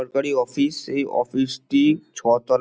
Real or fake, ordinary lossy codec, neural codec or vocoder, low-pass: real; none; none; none